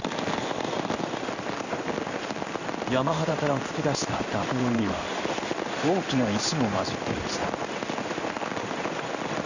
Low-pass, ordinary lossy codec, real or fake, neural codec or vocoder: 7.2 kHz; none; fake; codec, 16 kHz in and 24 kHz out, 1 kbps, XY-Tokenizer